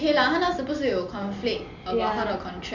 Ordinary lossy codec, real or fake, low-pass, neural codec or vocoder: none; real; 7.2 kHz; none